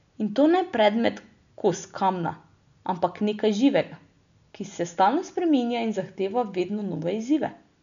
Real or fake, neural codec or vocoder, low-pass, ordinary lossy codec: real; none; 7.2 kHz; none